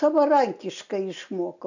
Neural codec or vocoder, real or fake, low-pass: none; real; 7.2 kHz